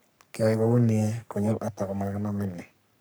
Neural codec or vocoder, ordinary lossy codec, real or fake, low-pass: codec, 44.1 kHz, 3.4 kbps, Pupu-Codec; none; fake; none